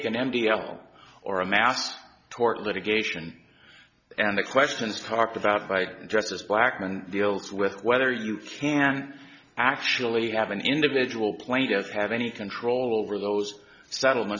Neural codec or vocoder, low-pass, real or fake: none; 7.2 kHz; real